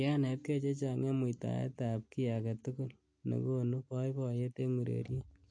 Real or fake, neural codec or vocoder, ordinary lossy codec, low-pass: real; none; MP3, 48 kbps; 9.9 kHz